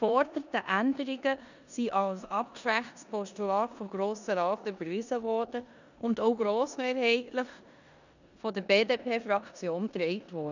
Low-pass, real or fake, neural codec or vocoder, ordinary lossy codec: 7.2 kHz; fake; codec, 16 kHz in and 24 kHz out, 0.9 kbps, LongCat-Audio-Codec, four codebook decoder; none